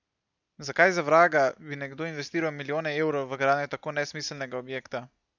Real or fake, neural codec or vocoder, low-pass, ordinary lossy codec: real; none; 7.2 kHz; none